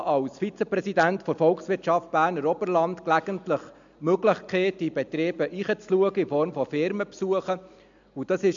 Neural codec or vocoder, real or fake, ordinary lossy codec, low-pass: none; real; MP3, 64 kbps; 7.2 kHz